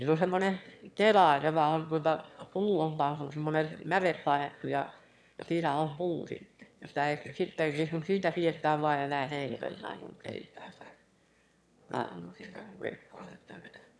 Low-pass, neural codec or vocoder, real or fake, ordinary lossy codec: none; autoencoder, 22.05 kHz, a latent of 192 numbers a frame, VITS, trained on one speaker; fake; none